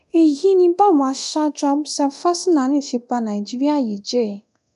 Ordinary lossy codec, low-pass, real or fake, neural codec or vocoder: none; 10.8 kHz; fake; codec, 24 kHz, 0.9 kbps, DualCodec